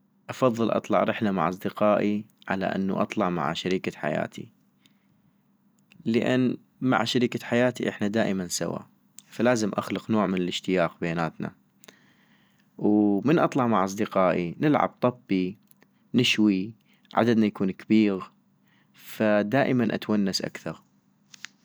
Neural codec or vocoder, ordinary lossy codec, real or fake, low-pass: none; none; real; none